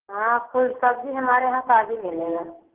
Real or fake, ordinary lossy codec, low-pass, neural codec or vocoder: real; Opus, 16 kbps; 3.6 kHz; none